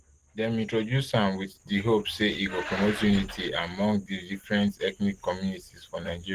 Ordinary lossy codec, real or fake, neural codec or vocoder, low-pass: Opus, 16 kbps; real; none; 14.4 kHz